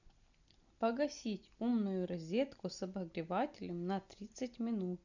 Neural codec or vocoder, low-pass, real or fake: none; 7.2 kHz; real